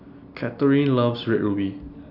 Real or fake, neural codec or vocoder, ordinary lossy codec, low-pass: real; none; none; 5.4 kHz